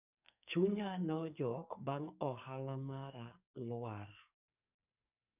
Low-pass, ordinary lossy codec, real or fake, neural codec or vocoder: 3.6 kHz; none; fake; codec, 44.1 kHz, 2.6 kbps, SNAC